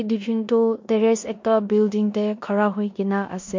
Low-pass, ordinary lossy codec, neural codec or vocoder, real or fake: 7.2 kHz; MP3, 48 kbps; codec, 16 kHz in and 24 kHz out, 0.9 kbps, LongCat-Audio-Codec, four codebook decoder; fake